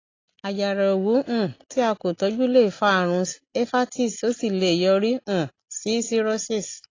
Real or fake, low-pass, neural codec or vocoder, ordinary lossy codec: real; 7.2 kHz; none; AAC, 32 kbps